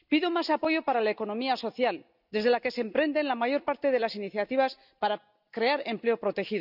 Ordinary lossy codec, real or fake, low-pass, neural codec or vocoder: none; real; 5.4 kHz; none